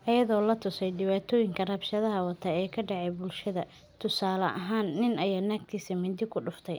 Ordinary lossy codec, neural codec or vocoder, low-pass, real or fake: none; none; none; real